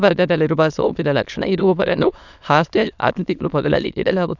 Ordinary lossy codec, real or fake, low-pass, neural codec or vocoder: none; fake; 7.2 kHz; autoencoder, 22.05 kHz, a latent of 192 numbers a frame, VITS, trained on many speakers